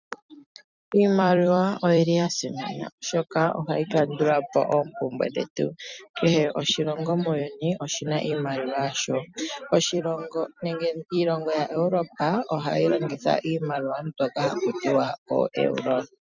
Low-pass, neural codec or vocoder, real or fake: 7.2 kHz; vocoder, 44.1 kHz, 128 mel bands every 256 samples, BigVGAN v2; fake